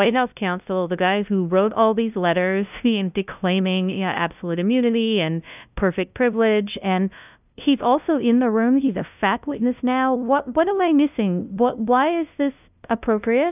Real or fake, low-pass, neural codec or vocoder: fake; 3.6 kHz; codec, 16 kHz, 0.5 kbps, FunCodec, trained on LibriTTS, 25 frames a second